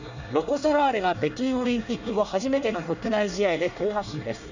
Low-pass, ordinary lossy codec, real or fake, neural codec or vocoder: 7.2 kHz; none; fake; codec, 24 kHz, 1 kbps, SNAC